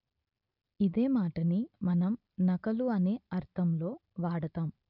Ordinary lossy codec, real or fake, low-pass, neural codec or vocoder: none; real; 5.4 kHz; none